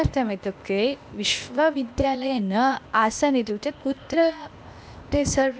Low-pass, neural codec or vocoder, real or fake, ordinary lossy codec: none; codec, 16 kHz, 0.8 kbps, ZipCodec; fake; none